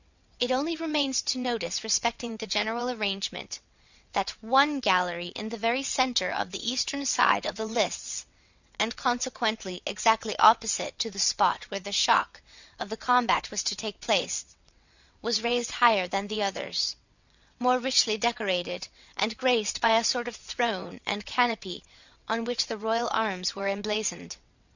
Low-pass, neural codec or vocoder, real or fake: 7.2 kHz; vocoder, 22.05 kHz, 80 mel bands, WaveNeXt; fake